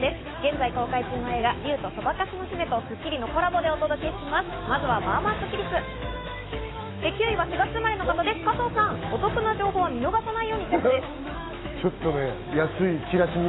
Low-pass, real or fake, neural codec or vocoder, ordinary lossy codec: 7.2 kHz; fake; autoencoder, 48 kHz, 128 numbers a frame, DAC-VAE, trained on Japanese speech; AAC, 16 kbps